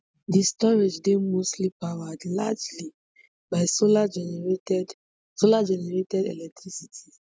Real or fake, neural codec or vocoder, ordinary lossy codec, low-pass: real; none; none; none